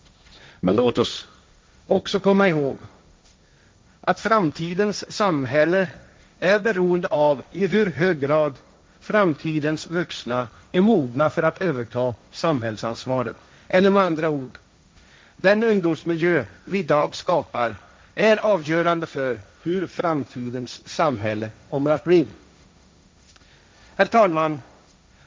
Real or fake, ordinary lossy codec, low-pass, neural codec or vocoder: fake; none; none; codec, 16 kHz, 1.1 kbps, Voila-Tokenizer